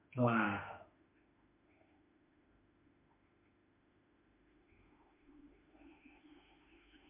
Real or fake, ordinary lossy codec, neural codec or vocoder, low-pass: fake; MP3, 32 kbps; autoencoder, 48 kHz, 32 numbers a frame, DAC-VAE, trained on Japanese speech; 3.6 kHz